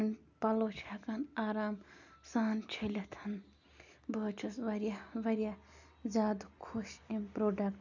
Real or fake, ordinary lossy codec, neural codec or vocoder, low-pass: real; none; none; 7.2 kHz